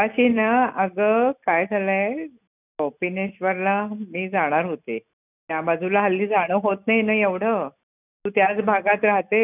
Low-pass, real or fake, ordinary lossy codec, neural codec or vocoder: 3.6 kHz; real; none; none